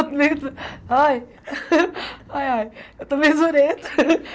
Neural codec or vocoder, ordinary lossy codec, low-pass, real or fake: none; none; none; real